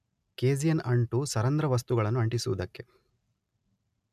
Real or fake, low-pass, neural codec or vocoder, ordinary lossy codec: real; 14.4 kHz; none; none